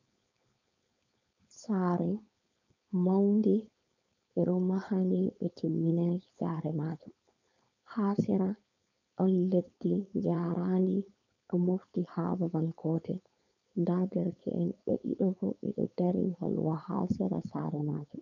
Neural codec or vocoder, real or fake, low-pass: codec, 16 kHz, 4.8 kbps, FACodec; fake; 7.2 kHz